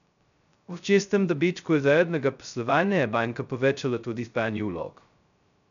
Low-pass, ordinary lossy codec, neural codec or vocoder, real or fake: 7.2 kHz; MP3, 96 kbps; codec, 16 kHz, 0.2 kbps, FocalCodec; fake